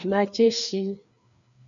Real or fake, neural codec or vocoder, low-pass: fake; codec, 16 kHz, 2 kbps, FreqCodec, larger model; 7.2 kHz